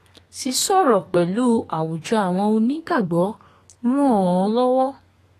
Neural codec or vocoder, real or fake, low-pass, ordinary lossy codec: codec, 32 kHz, 1.9 kbps, SNAC; fake; 14.4 kHz; AAC, 48 kbps